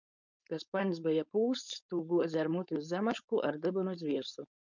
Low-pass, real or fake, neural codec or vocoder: 7.2 kHz; fake; codec, 16 kHz, 4.8 kbps, FACodec